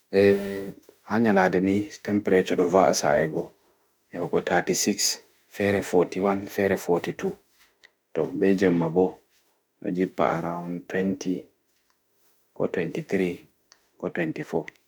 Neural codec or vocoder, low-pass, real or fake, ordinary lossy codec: autoencoder, 48 kHz, 32 numbers a frame, DAC-VAE, trained on Japanese speech; none; fake; none